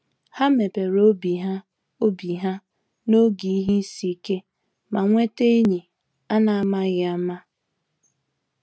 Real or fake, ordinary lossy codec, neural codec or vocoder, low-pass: real; none; none; none